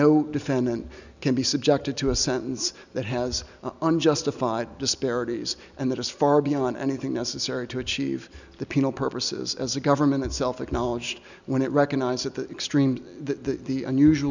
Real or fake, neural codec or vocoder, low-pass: real; none; 7.2 kHz